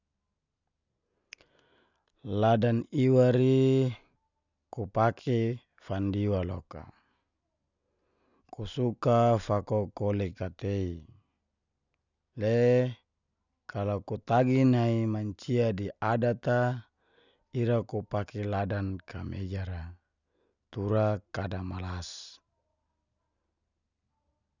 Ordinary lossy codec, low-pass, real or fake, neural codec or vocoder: none; 7.2 kHz; real; none